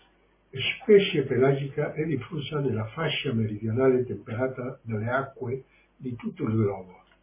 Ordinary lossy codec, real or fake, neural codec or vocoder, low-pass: MP3, 16 kbps; real; none; 3.6 kHz